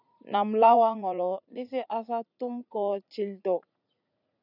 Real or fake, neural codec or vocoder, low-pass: fake; vocoder, 44.1 kHz, 80 mel bands, Vocos; 5.4 kHz